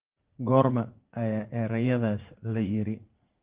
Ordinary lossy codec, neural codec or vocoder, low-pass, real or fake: Opus, 16 kbps; vocoder, 44.1 kHz, 80 mel bands, Vocos; 3.6 kHz; fake